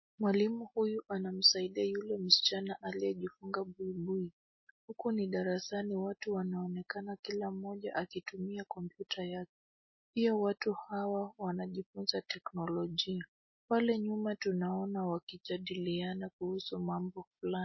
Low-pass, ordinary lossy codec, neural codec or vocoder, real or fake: 7.2 kHz; MP3, 24 kbps; none; real